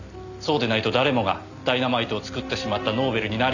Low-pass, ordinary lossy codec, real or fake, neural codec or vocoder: 7.2 kHz; none; real; none